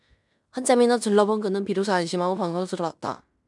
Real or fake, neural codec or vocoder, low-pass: fake; codec, 16 kHz in and 24 kHz out, 0.9 kbps, LongCat-Audio-Codec, fine tuned four codebook decoder; 10.8 kHz